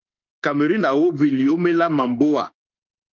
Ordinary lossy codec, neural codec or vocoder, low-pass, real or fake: Opus, 24 kbps; autoencoder, 48 kHz, 32 numbers a frame, DAC-VAE, trained on Japanese speech; 7.2 kHz; fake